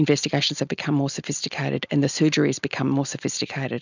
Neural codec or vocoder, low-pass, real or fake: none; 7.2 kHz; real